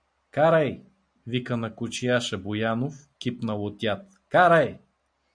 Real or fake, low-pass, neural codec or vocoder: real; 9.9 kHz; none